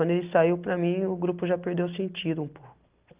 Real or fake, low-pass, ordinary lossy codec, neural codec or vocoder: real; 3.6 kHz; Opus, 32 kbps; none